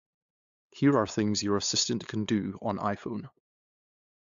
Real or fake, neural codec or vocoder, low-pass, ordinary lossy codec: fake; codec, 16 kHz, 8 kbps, FunCodec, trained on LibriTTS, 25 frames a second; 7.2 kHz; AAC, 64 kbps